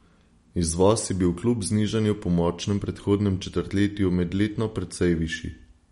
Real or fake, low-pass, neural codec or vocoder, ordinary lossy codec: real; 19.8 kHz; none; MP3, 48 kbps